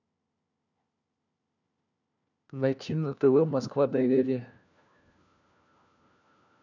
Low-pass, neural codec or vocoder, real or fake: 7.2 kHz; codec, 16 kHz, 1 kbps, FunCodec, trained on LibriTTS, 50 frames a second; fake